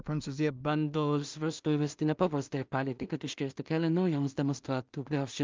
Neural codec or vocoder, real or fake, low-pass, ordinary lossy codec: codec, 16 kHz in and 24 kHz out, 0.4 kbps, LongCat-Audio-Codec, two codebook decoder; fake; 7.2 kHz; Opus, 24 kbps